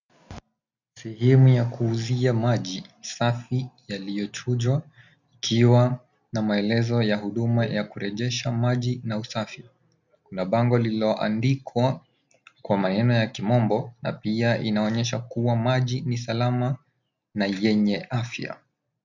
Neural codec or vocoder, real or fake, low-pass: none; real; 7.2 kHz